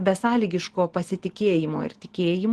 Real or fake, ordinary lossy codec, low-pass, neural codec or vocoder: real; Opus, 16 kbps; 10.8 kHz; none